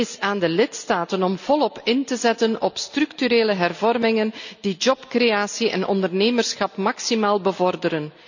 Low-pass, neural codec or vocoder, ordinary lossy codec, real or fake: 7.2 kHz; none; none; real